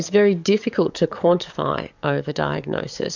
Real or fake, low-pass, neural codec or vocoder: fake; 7.2 kHz; codec, 44.1 kHz, 7.8 kbps, DAC